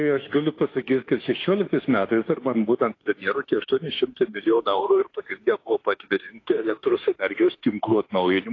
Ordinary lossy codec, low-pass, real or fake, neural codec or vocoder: AAC, 32 kbps; 7.2 kHz; fake; autoencoder, 48 kHz, 32 numbers a frame, DAC-VAE, trained on Japanese speech